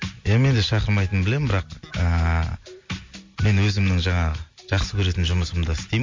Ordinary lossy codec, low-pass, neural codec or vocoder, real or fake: MP3, 48 kbps; 7.2 kHz; none; real